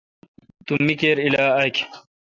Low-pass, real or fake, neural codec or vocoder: 7.2 kHz; real; none